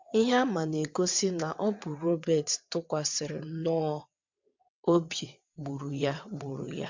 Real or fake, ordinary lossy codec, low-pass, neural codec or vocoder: fake; MP3, 64 kbps; 7.2 kHz; vocoder, 22.05 kHz, 80 mel bands, WaveNeXt